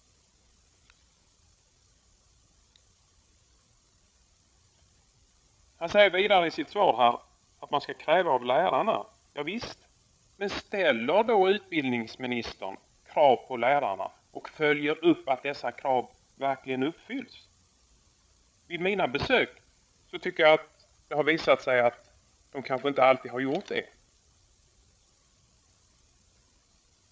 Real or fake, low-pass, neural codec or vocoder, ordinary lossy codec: fake; none; codec, 16 kHz, 8 kbps, FreqCodec, larger model; none